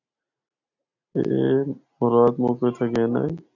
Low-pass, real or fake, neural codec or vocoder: 7.2 kHz; real; none